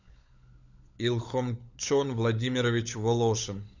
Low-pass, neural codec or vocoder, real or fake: 7.2 kHz; codec, 16 kHz, 16 kbps, FunCodec, trained on LibriTTS, 50 frames a second; fake